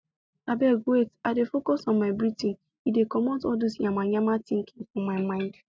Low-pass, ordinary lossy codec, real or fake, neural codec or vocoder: none; none; real; none